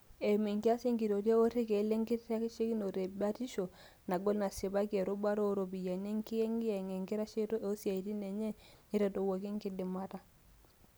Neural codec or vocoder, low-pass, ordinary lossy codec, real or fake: none; none; none; real